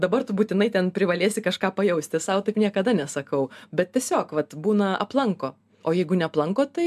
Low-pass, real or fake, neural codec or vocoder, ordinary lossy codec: 14.4 kHz; real; none; MP3, 96 kbps